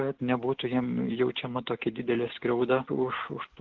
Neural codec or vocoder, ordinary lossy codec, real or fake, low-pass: none; Opus, 16 kbps; real; 7.2 kHz